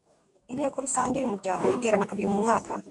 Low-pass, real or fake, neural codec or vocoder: 10.8 kHz; fake; codec, 44.1 kHz, 2.6 kbps, DAC